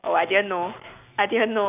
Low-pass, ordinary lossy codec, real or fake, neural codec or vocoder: 3.6 kHz; none; real; none